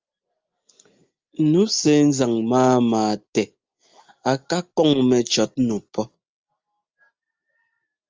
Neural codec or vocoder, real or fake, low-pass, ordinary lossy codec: none; real; 7.2 kHz; Opus, 24 kbps